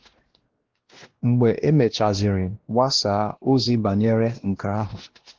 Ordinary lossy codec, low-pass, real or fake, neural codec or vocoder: Opus, 16 kbps; 7.2 kHz; fake; codec, 16 kHz, 1 kbps, X-Codec, WavLM features, trained on Multilingual LibriSpeech